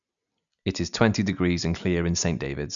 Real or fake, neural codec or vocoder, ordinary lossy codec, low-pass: real; none; MP3, 96 kbps; 7.2 kHz